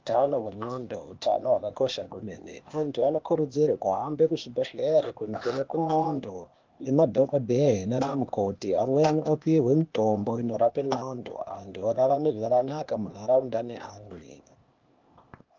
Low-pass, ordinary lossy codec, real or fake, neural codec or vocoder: 7.2 kHz; Opus, 32 kbps; fake; codec, 16 kHz, 0.8 kbps, ZipCodec